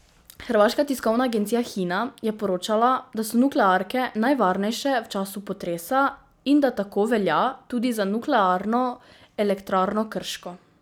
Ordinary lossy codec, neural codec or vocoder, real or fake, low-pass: none; none; real; none